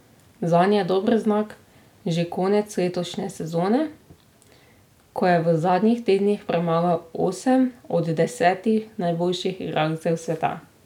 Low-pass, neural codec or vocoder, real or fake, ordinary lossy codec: 19.8 kHz; none; real; none